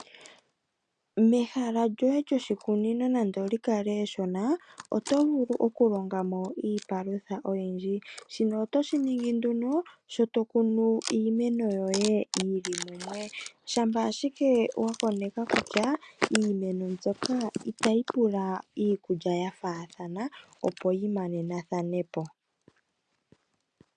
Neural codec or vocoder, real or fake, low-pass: none; real; 9.9 kHz